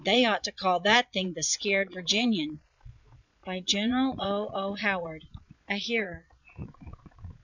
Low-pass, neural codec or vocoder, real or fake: 7.2 kHz; vocoder, 22.05 kHz, 80 mel bands, Vocos; fake